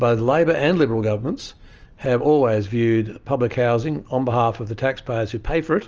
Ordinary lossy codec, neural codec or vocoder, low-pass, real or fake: Opus, 24 kbps; none; 7.2 kHz; real